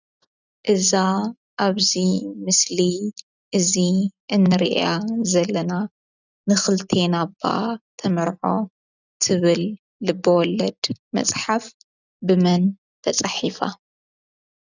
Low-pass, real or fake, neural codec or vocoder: 7.2 kHz; real; none